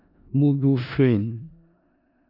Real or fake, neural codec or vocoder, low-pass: fake; codec, 16 kHz in and 24 kHz out, 0.4 kbps, LongCat-Audio-Codec, four codebook decoder; 5.4 kHz